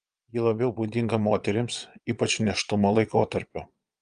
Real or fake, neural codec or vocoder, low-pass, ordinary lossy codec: fake; vocoder, 22.05 kHz, 80 mel bands, Vocos; 9.9 kHz; Opus, 32 kbps